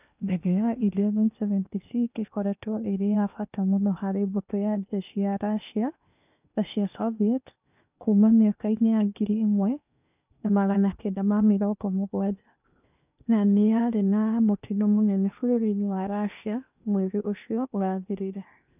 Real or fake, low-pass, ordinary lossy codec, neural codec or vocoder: fake; 3.6 kHz; none; codec, 16 kHz in and 24 kHz out, 0.8 kbps, FocalCodec, streaming, 65536 codes